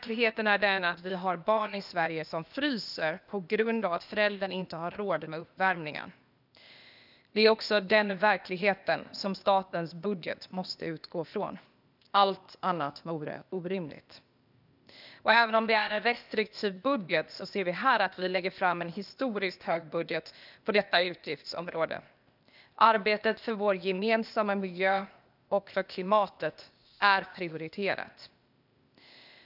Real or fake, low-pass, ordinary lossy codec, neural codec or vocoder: fake; 5.4 kHz; none; codec, 16 kHz, 0.8 kbps, ZipCodec